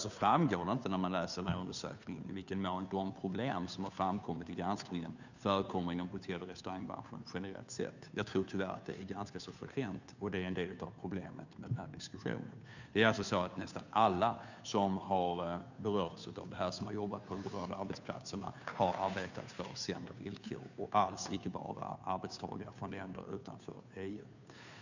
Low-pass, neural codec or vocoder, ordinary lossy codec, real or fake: 7.2 kHz; codec, 16 kHz, 2 kbps, FunCodec, trained on Chinese and English, 25 frames a second; none; fake